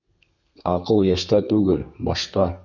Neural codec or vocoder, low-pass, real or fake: codec, 32 kHz, 1.9 kbps, SNAC; 7.2 kHz; fake